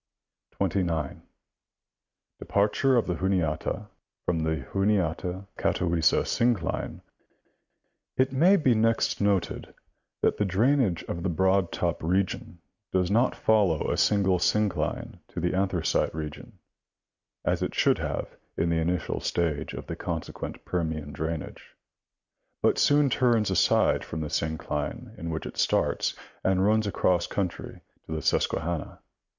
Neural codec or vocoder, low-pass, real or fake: none; 7.2 kHz; real